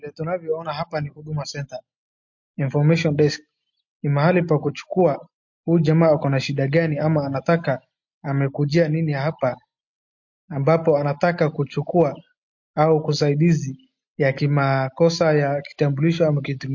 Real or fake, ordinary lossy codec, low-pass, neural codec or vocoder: real; MP3, 48 kbps; 7.2 kHz; none